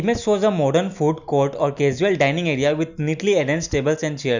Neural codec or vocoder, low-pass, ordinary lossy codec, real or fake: none; 7.2 kHz; none; real